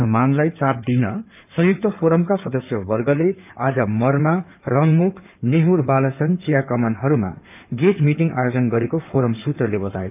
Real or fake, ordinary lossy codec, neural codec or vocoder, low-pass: fake; none; codec, 16 kHz in and 24 kHz out, 2.2 kbps, FireRedTTS-2 codec; 3.6 kHz